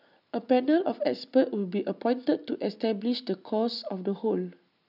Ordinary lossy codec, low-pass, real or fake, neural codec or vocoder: none; 5.4 kHz; real; none